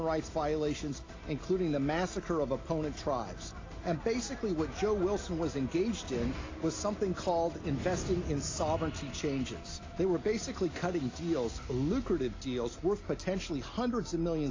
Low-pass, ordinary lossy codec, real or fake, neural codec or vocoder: 7.2 kHz; AAC, 32 kbps; real; none